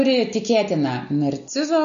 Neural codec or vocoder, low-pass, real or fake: none; 7.2 kHz; real